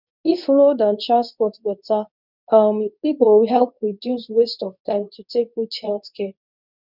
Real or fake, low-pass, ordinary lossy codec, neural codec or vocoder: fake; 5.4 kHz; none; codec, 24 kHz, 0.9 kbps, WavTokenizer, medium speech release version 2